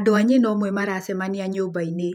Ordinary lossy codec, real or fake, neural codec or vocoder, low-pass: none; fake; vocoder, 48 kHz, 128 mel bands, Vocos; 19.8 kHz